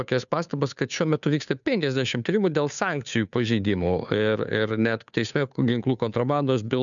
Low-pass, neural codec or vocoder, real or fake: 7.2 kHz; codec, 16 kHz, 2 kbps, FunCodec, trained on Chinese and English, 25 frames a second; fake